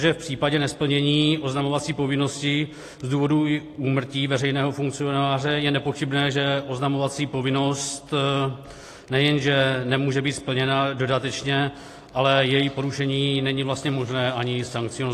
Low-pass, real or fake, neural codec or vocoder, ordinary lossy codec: 14.4 kHz; real; none; AAC, 48 kbps